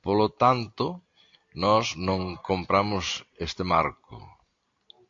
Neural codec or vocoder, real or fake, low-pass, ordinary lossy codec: none; real; 7.2 kHz; AAC, 48 kbps